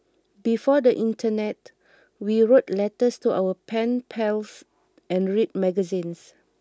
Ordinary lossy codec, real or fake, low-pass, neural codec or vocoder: none; real; none; none